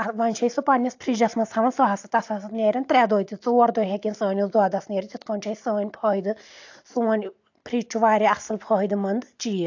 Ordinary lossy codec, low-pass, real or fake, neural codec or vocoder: AAC, 48 kbps; 7.2 kHz; real; none